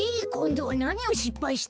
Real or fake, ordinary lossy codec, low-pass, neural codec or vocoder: fake; none; none; codec, 16 kHz, 4 kbps, X-Codec, HuBERT features, trained on balanced general audio